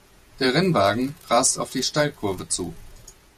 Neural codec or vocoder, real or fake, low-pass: vocoder, 48 kHz, 128 mel bands, Vocos; fake; 14.4 kHz